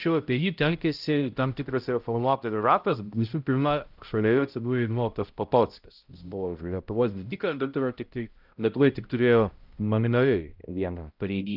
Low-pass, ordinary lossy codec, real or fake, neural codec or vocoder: 5.4 kHz; Opus, 32 kbps; fake; codec, 16 kHz, 0.5 kbps, X-Codec, HuBERT features, trained on balanced general audio